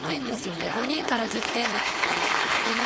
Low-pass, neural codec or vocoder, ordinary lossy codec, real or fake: none; codec, 16 kHz, 4.8 kbps, FACodec; none; fake